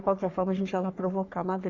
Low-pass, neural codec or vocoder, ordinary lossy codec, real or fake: 7.2 kHz; codec, 44.1 kHz, 3.4 kbps, Pupu-Codec; Opus, 64 kbps; fake